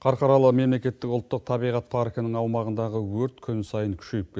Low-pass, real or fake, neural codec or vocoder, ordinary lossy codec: none; real; none; none